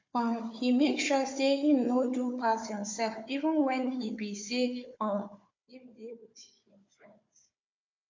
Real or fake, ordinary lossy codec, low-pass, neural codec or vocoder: fake; MP3, 48 kbps; 7.2 kHz; codec, 16 kHz, 4 kbps, FunCodec, trained on Chinese and English, 50 frames a second